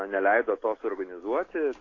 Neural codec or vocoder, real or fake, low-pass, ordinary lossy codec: none; real; 7.2 kHz; AAC, 32 kbps